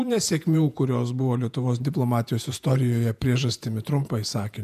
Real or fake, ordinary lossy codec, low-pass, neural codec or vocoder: fake; MP3, 96 kbps; 14.4 kHz; vocoder, 48 kHz, 128 mel bands, Vocos